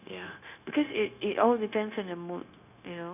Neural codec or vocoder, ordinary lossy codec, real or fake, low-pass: codec, 16 kHz, 0.9 kbps, LongCat-Audio-Codec; none; fake; 3.6 kHz